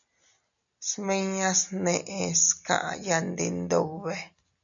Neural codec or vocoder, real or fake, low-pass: none; real; 7.2 kHz